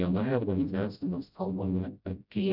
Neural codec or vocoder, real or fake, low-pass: codec, 16 kHz, 0.5 kbps, FreqCodec, smaller model; fake; 5.4 kHz